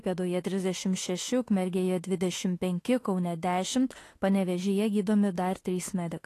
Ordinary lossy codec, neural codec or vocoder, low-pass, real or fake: AAC, 48 kbps; autoencoder, 48 kHz, 32 numbers a frame, DAC-VAE, trained on Japanese speech; 14.4 kHz; fake